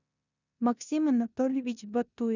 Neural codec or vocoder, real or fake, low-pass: codec, 16 kHz in and 24 kHz out, 0.9 kbps, LongCat-Audio-Codec, four codebook decoder; fake; 7.2 kHz